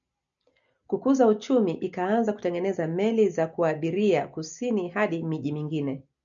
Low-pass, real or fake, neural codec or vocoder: 7.2 kHz; real; none